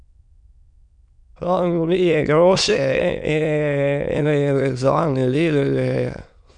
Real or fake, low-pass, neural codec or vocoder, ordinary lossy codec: fake; 9.9 kHz; autoencoder, 22.05 kHz, a latent of 192 numbers a frame, VITS, trained on many speakers; none